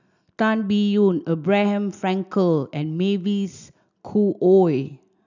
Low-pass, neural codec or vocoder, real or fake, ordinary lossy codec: 7.2 kHz; none; real; none